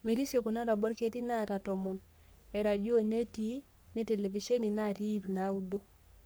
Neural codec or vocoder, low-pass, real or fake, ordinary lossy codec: codec, 44.1 kHz, 3.4 kbps, Pupu-Codec; none; fake; none